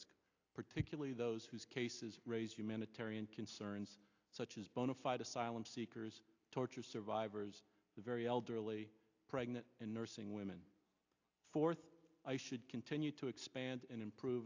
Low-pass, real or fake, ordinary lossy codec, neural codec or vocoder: 7.2 kHz; real; AAC, 48 kbps; none